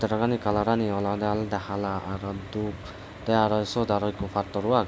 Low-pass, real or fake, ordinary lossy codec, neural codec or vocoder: none; real; none; none